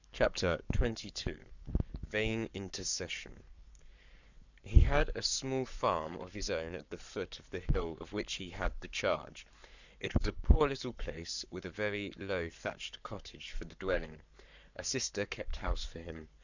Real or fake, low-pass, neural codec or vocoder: fake; 7.2 kHz; codec, 44.1 kHz, 7.8 kbps, Pupu-Codec